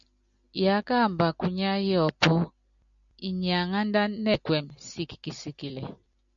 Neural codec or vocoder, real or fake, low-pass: none; real; 7.2 kHz